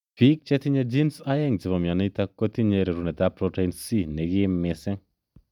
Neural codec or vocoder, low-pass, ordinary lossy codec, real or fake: none; 19.8 kHz; none; real